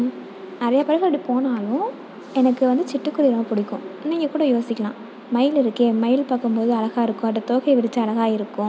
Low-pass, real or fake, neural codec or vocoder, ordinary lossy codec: none; real; none; none